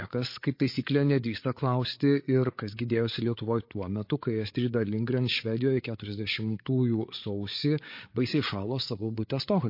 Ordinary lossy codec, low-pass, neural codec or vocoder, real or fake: MP3, 32 kbps; 5.4 kHz; codec, 16 kHz, 8 kbps, FreqCodec, larger model; fake